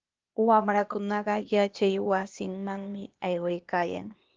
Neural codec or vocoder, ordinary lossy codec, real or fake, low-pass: codec, 16 kHz, 0.8 kbps, ZipCodec; Opus, 24 kbps; fake; 7.2 kHz